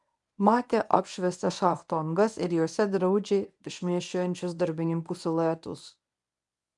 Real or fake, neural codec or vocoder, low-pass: fake; codec, 24 kHz, 0.9 kbps, WavTokenizer, medium speech release version 1; 10.8 kHz